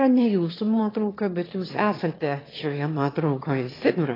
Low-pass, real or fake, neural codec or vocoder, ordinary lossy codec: 5.4 kHz; fake; autoencoder, 22.05 kHz, a latent of 192 numbers a frame, VITS, trained on one speaker; AAC, 24 kbps